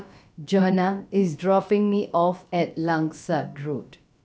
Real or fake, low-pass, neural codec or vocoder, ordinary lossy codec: fake; none; codec, 16 kHz, about 1 kbps, DyCAST, with the encoder's durations; none